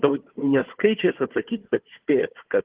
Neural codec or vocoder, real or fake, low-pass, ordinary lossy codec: codec, 16 kHz, 4 kbps, FunCodec, trained on Chinese and English, 50 frames a second; fake; 3.6 kHz; Opus, 16 kbps